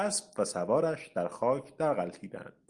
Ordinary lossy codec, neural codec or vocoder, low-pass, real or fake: Opus, 32 kbps; none; 10.8 kHz; real